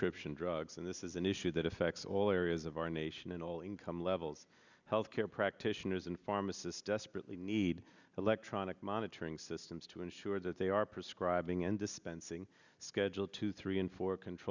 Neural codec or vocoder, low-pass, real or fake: none; 7.2 kHz; real